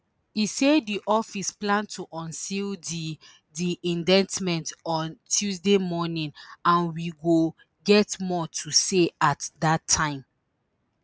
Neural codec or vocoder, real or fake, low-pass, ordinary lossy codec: none; real; none; none